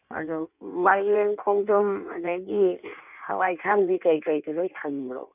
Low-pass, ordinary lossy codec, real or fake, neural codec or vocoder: 3.6 kHz; none; fake; codec, 16 kHz in and 24 kHz out, 1.1 kbps, FireRedTTS-2 codec